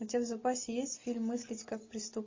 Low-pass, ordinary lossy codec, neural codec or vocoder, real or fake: 7.2 kHz; MP3, 32 kbps; none; real